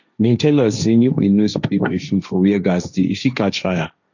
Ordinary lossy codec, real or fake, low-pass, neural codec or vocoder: none; fake; 7.2 kHz; codec, 16 kHz, 1.1 kbps, Voila-Tokenizer